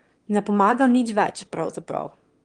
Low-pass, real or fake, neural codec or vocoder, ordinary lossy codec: 9.9 kHz; fake; autoencoder, 22.05 kHz, a latent of 192 numbers a frame, VITS, trained on one speaker; Opus, 24 kbps